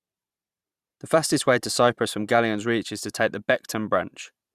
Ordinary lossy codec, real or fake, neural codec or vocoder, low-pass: Opus, 64 kbps; fake; vocoder, 44.1 kHz, 128 mel bands every 512 samples, BigVGAN v2; 14.4 kHz